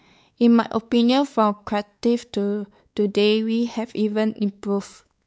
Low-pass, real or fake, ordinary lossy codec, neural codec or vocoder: none; fake; none; codec, 16 kHz, 4 kbps, X-Codec, WavLM features, trained on Multilingual LibriSpeech